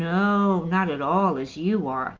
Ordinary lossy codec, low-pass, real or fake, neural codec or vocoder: Opus, 32 kbps; 7.2 kHz; real; none